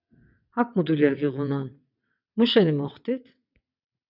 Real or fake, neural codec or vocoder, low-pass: fake; vocoder, 22.05 kHz, 80 mel bands, WaveNeXt; 5.4 kHz